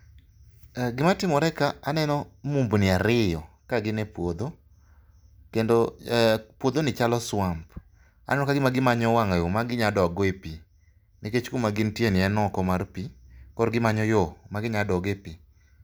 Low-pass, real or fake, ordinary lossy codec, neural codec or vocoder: none; real; none; none